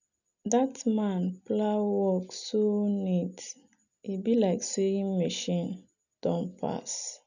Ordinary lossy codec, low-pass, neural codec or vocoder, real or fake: none; 7.2 kHz; none; real